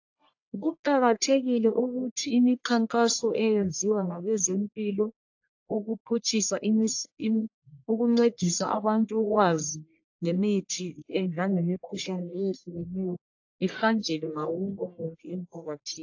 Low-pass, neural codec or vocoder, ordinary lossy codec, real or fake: 7.2 kHz; codec, 44.1 kHz, 1.7 kbps, Pupu-Codec; AAC, 48 kbps; fake